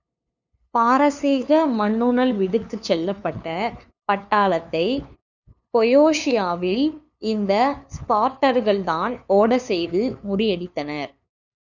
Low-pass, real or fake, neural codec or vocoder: 7.2 kHz; fake; codec, 16 kHz, 2 kbps, FunCodec, trained on LibriTTS, 25 frames a second